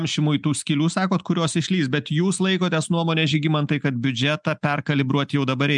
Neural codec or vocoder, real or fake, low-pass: none; real; 10.8 kHz